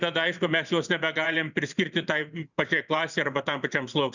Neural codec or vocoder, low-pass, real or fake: vocoder, 44.1 kHz, 128 mel bands every 512 samples, BigVGAN v2; 7.2 kHz; fake